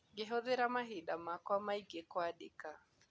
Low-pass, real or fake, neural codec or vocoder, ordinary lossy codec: none; real; none; none